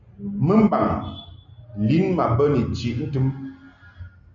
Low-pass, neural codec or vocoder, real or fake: 7.2 kHz; none; real